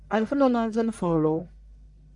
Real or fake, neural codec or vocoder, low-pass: fake; codec, 44.1 kHz, 1.7 kbps, Pupu-Codec; 10.8 kHz